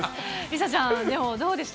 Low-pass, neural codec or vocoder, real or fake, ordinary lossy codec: none; none; real; none